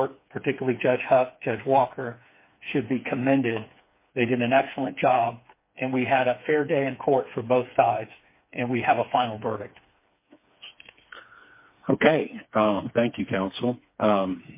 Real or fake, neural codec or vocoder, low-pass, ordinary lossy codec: fake; codec, 16 kHz, 4 kbps, FreqCodec, smaller model; 3.6 kHz; MP3, 24 kbps